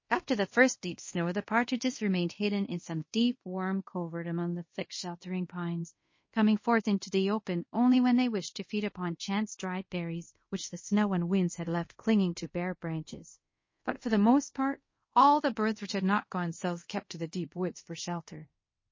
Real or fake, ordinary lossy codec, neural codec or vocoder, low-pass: fake; MP3, 32 kbps; codec, 24 kHz, 0.5 kbps, DualCodec; 7.2 kHz